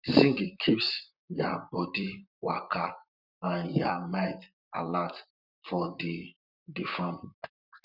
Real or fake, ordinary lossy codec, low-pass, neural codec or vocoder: fake; none; 5.4 kHz; vocoder, 44.1 kHz, 128 mel bands, Pupu-Vocoder